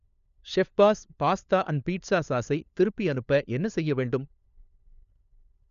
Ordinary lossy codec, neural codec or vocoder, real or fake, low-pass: none; codec, 16 kHz, 4 kbps, FunCodec, trained on LibriTTS, 50 frames a second; fake; 7.2 kHz